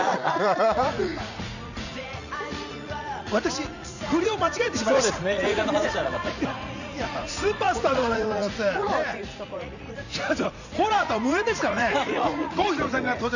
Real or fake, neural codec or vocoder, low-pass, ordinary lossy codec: fake; vocoder, 44.1 kHz, 80 mel bands, Vocos; 7.2 kHz; none